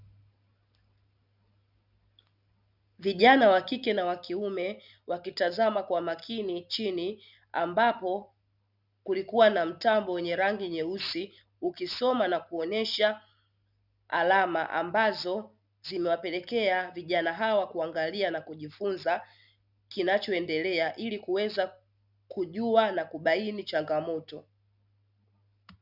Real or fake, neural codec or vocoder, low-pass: real; none; 5.4 kHz